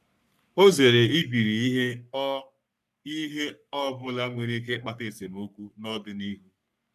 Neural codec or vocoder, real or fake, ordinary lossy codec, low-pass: codec, 44.1 kHz, 3.4 kbps, Pupu-Codec; fake; none; 14.4 kHz